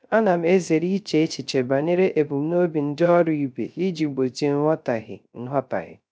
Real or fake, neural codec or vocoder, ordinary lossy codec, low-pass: fake; codec, 16 kHz, 0.3 kbps, FocalCodec; none; none